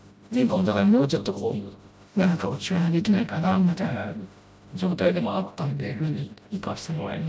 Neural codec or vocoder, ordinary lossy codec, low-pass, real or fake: codec, 16 kHz, 0.5 kbps, FreqCodec, smaller model; none; none; fake